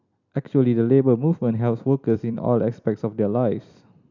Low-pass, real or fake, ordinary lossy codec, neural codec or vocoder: 7.2 kHz; fake; none; vocoder, 44.1 kHz, 128 mel bands every 256 samples, BigVGAN v2